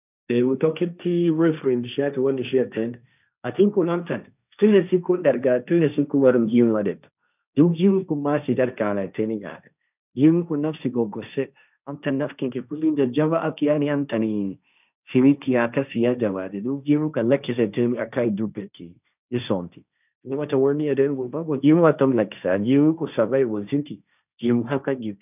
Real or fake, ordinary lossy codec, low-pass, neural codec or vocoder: fake; none; 3.6 kHz; codec, 16 kHz, 1.1 kbps, Voila-Tokenizer